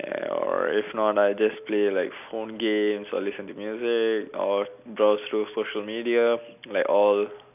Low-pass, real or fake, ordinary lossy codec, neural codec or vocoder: 3.6 kHz; real; none; none